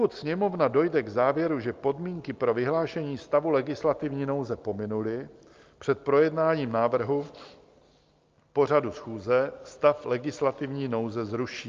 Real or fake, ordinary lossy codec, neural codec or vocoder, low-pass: real; Opus, 24 kbps; none; 7.2 kHz